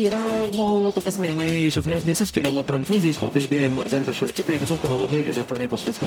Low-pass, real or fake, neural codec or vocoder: 19.8 kHz; fake; codec, 44.1 kHz, 0.9 kbps, DAC